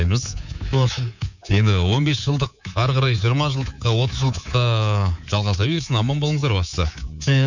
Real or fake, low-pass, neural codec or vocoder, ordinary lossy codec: fake; 7.2 kHz; codec, 24 kHz, 3.1 kbps, DualCodec; none